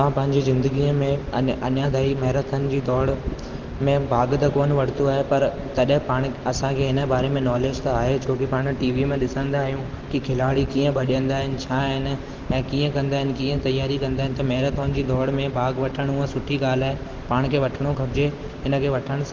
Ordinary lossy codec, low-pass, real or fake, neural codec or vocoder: Opus, 16 kbps; 7.2 kHz; real; none